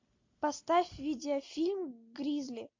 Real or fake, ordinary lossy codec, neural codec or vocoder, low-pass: real; MP3, 48 kbps; none; 7.2 kHz